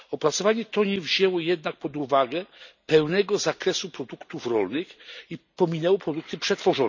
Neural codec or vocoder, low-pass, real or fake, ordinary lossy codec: none; 7.2 kHz; real; none